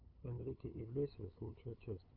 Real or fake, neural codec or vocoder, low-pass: fake; codec, 16 kHz, 8 kbps, FunCodec, trained on LibriTTS, 25 frames a second; 5.4 kHz